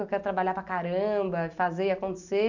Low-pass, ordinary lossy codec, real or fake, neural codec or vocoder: 7.2 kHz; none; real; none